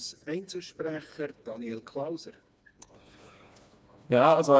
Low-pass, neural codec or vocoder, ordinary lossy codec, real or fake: none; codec, 16 kHz, 2 kbps, FreqCodec, smaller model; none; fake